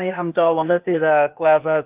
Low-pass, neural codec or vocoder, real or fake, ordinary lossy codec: 3.6 kHz; codec, 16 kHz, 0.8 kbps, ZipCodec; fake; Opus, 24 kbps